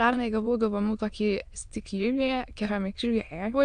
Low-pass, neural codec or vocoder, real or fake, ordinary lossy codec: 9.9 kHz; autoencoder, 22.05 kHz, a latent of 192 numbers a frame, VITS, trained on many speakers; fake; AAC, 64 kbps